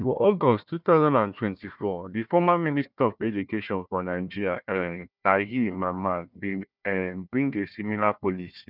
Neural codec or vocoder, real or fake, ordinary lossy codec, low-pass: codec, 16 kHz, 1 kbps, FunCodec, trained on Chinese and English, 50 frames a second; fake; none; 5.4 kHz